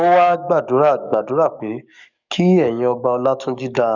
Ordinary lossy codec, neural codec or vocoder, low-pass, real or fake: none; codec, 44.1 kHz, 7.8 kbps, DAC; 7.2 kHz; fake